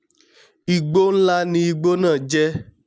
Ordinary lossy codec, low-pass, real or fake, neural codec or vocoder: none; none; real; none